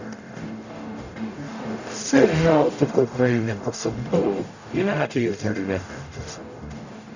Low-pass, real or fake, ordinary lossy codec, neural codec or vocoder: 7.2 kHz; fake; none; codec, 44.1 kHz, 0.9 kbps, DAC